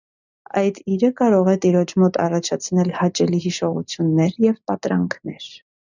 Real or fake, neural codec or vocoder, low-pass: real; none; 7.2 kHz